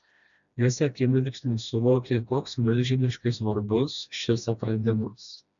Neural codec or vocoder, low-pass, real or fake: codec, 16 kHz, 1 kbps, FreqCodec, smaller model; 7.2 kHz; fake